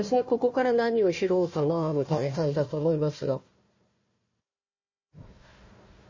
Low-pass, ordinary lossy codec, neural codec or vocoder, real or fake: 7.2 kHz; MP3, 32 kbps; codec, 16 kHz, 1 kbps, FunCodec, trained on Chinese and English, 50 frames a second; fake